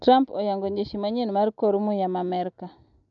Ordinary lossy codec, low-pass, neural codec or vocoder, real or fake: none; 7.2 kHz; none; real